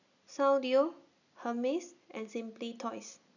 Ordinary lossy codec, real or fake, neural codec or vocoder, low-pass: none; real; none; 7.2 kHz